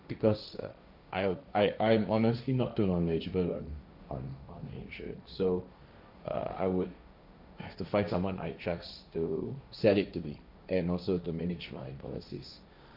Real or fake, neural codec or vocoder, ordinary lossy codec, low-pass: fake; codec, 16 kHz, 1.1 kbps, Voila-Tokenizer; none; 5.4 kHz